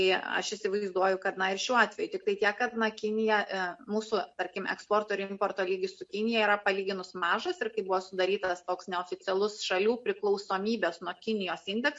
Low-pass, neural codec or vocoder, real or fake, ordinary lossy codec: 10.8 kHz; none; real; MP3, 48 kbps